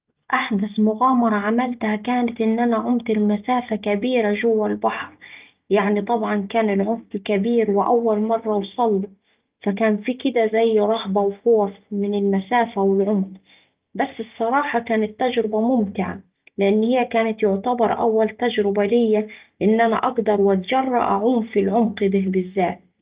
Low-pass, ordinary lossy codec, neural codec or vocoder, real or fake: 3.6 kHz; Opus, 32 kbps; none; real